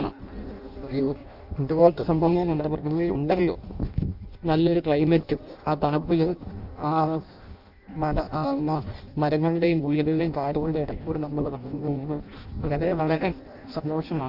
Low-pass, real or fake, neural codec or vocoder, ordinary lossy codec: 5.4 kHz; fake; codec, 16 kHz in and 24 kHz out, 0.6 kbps, FireRedTTS-2 codec; none